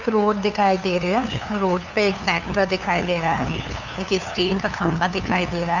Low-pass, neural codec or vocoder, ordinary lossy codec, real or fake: 7.2 kHz; codec, 16 kHz, 2 kbps, FunCodec, trained on LibriTTS, 25 frames a second; none; fake